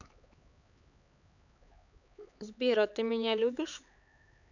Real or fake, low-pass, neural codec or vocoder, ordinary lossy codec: fake; 7.2 kHz; codec, 16 kHz, 4 kbps, X-Codec, HuBERT features, trained on LibriSpeech; none